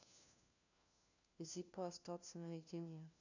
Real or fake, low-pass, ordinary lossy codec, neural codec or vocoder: fake; 7.2 kHz; none; codec, 16 kHz, 1 kbps, FunCodec, trained on LibriTTS, 50 frames a second